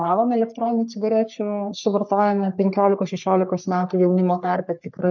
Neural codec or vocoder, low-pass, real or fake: codec, 44.1 kHz, 3.4 kbps, Pupu-Codec; 7.2 kHz; fake